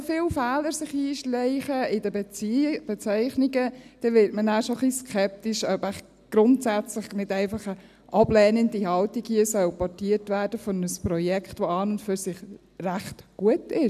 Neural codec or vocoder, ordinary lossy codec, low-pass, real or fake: none; none; 14.4 kHz; real